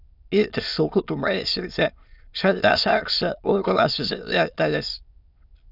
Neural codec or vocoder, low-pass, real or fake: autoencoder, 22.05 kHz, a latent of 192 numbers a frame, VITS, trained on many speakers; 5.4 kHz; fake